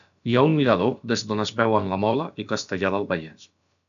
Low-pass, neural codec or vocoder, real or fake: 7.2 kHz; codec, 16 kHz, about 1 kbps, DyCAST, with the encoder's durations; fake